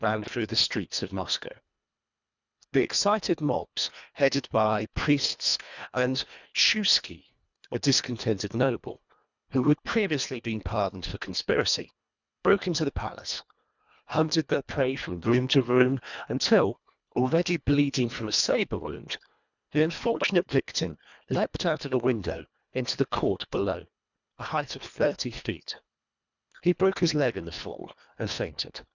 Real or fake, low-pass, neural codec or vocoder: fake; 7.2 kHz; codec, 24 kHz, 1.5 kbps, HILCodec